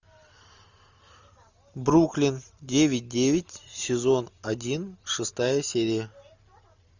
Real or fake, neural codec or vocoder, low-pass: real; none; 7.2 kHz